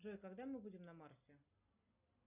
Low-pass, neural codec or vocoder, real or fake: 3.6 kHz; none; real